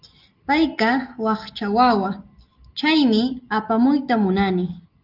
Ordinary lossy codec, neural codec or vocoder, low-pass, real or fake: Opus, 32 kbps; none; 5.4 kHz; real